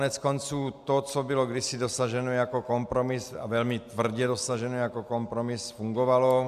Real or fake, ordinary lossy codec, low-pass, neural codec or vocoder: real; AAC, 96 kbps; 14.4 kHz; none